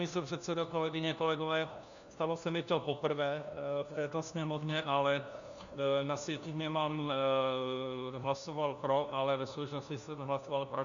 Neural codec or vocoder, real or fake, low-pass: codec, 16 kHz, 1 kbps, FunCodec, trained on LibriTTS, 50 frames a second; fake; 7.2 kHz